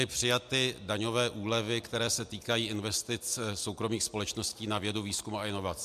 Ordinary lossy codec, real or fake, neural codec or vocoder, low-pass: MP3, 96 kbps; real; none; 14.4 kHz